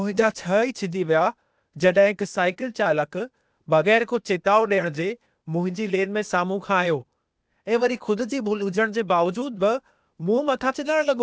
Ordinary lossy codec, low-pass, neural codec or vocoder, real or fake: none; none; codec, 16 kHz, 0.8 kbps, ZipCodec; fake